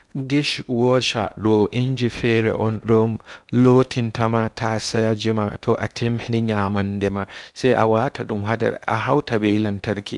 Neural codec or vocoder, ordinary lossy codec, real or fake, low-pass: codec, 16 kHz in and 24 kHz out, 0.8 kbps, FocalCodec, streaming, 65536 codes; none; fake; 10.8 kHz